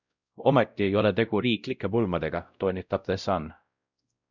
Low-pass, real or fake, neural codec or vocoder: 7.2 kHz; fake; codec, 16 kHz, 0.5 kbps, X-Codec, WavLM features, trained on Multilingual LibriSpeech